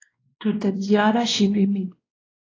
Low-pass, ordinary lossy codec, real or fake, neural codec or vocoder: 7.2 kHz; AAC, 32 kbps; fake; codec, 16 kHz, 1 kbps, X-Codec, WavLM features, trained on Multilingual LibriSpeech